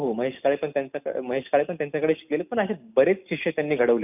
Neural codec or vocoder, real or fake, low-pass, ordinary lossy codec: none; real; 3.6 kHz; none